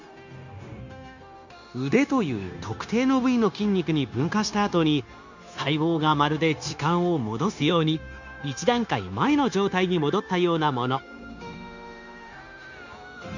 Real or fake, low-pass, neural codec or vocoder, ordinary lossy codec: fake; 7.2 kHz; codec, 16 kHz, 0.9 kbps, LongCat-Audio-Codec; none